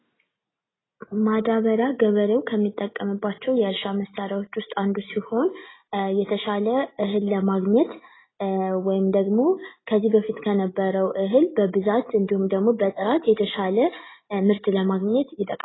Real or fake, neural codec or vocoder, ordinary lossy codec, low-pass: real; none; AAC, 16 kbps; 7.2 kHz